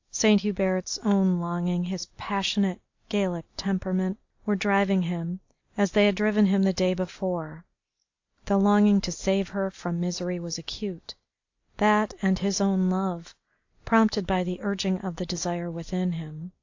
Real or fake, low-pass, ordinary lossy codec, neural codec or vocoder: real; 7.2 kHz; AAC, 48 kbps; none